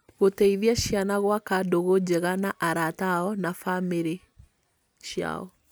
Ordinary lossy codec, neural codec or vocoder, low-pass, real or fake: none; none; none; real